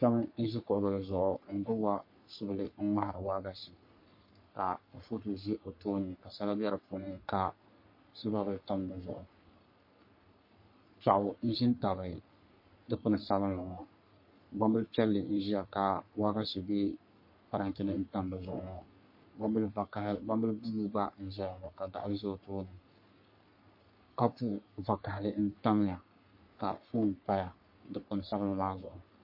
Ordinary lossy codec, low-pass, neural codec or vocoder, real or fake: MP3, 32 kbps; 5.4 kHz; codec, 44.1 kHz, 3.4 kbps, Pupu-Codec; fake